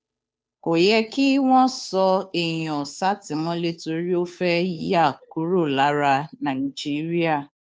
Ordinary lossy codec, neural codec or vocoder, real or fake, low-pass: none; codec, 16 kHz, 2 kbps, FunCodec, trained on Chinese and English, 25 frames a second; fake; none